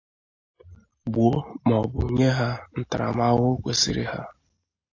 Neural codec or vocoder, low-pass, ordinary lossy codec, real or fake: none; 7.2 kHz; AAC, 48 kbps; real